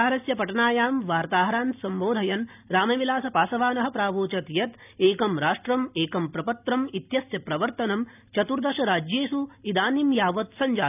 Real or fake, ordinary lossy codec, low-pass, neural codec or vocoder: real; none; 3.6 kHz; none